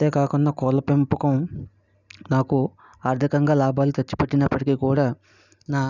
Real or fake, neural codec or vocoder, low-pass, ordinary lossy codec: real; none; 7.2 kHz; none